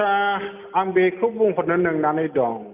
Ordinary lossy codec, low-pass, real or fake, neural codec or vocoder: none; 3.6 kHz; real; none